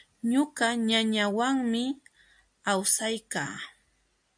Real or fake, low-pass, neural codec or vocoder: real; 9.9 kHz; none